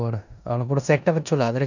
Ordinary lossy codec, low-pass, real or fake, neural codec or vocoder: none; 7.2 kHz; fake; codec, 16 kHz in and 24 kHz out, 0.9 kbps, LongCat-Audio-Codec, four codebook decoder